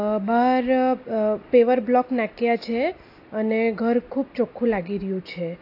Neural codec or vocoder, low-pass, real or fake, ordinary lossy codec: none; 5.4 kHz; real; AAC, 32 kbps